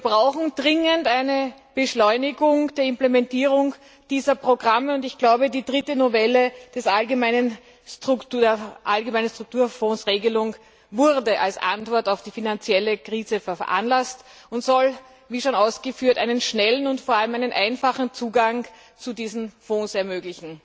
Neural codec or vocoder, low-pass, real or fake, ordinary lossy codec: none; none; real; none